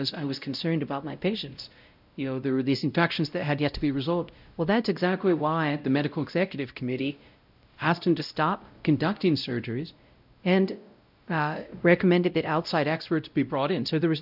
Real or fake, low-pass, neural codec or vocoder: fake; 5.4 kHz; codec, 16 kHz, 0.5 kbps, X-Codec, WavLM features, trained on Multilingual LibriSpeech